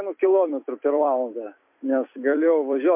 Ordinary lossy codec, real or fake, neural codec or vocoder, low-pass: MP3, 32 kbps; fake; vocoder, 44.1 kHz, 128 mel bands every 256 samples, BigVGAN v2; 3.6 kHz